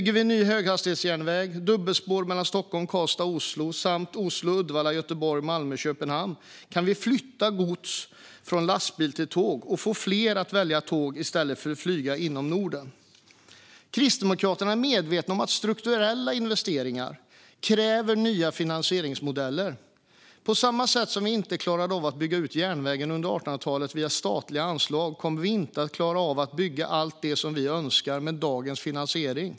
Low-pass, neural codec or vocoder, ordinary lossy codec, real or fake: none; none; none; real